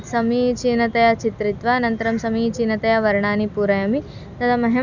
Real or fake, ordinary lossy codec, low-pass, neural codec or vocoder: real; none; 7.2 kHz; none